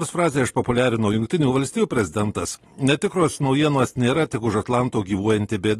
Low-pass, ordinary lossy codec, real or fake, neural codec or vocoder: 19.8 kHz; AAC, 32 kbps; real; none